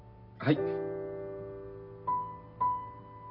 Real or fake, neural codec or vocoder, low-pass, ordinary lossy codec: real; none; 5.4 kHz; none